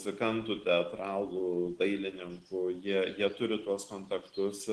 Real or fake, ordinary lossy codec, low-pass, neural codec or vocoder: real; Opus, 16 kbps; 10.8 kHz; none